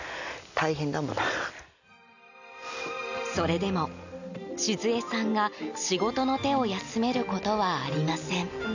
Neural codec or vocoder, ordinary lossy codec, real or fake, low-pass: none; none; real; 7.2 kHz